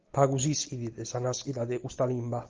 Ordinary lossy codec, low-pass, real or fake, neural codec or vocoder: Opus, 32 kbps; 7.2 kHz; real; none